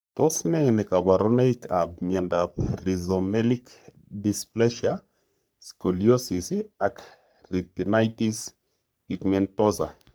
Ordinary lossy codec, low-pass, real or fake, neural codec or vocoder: none; none; fake; codec, 44.1 kHz, 3.4 kbps, Pupu-Codec